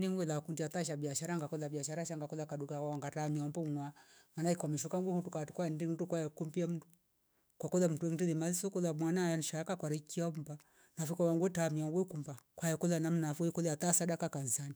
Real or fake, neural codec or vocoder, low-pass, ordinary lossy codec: fake; autoencoder, 48 kHz, 128 numbers a frame, DAC-VAE, trained on Japanese speech; none; none